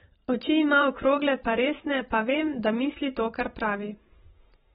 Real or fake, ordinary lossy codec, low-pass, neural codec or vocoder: fake; AAC, 16 kbps; 19.8 kHz; vocoder, 44.1 kHz, 128 mel bands every 512 samples, BigVGAN v2